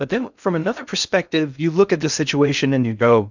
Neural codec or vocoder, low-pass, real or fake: codec, 16 kHz in and 24 kHz out, 0.6 kbps, FocalCodec, streaming, 2048 codes; 7.2 kHz; fake